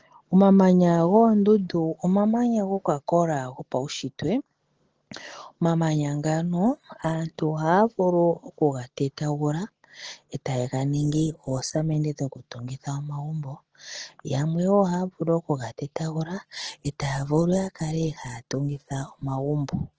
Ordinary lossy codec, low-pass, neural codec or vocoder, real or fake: Opus, 16 kbps; 7.2 kHz; none; real